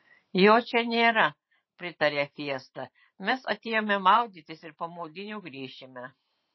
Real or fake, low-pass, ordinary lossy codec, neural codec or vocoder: fake; 7.2 kHz; MP3, 24 kbps; vocoder, 44.1 kHz, 128 mel bands every 512 samples, BigVGAN v2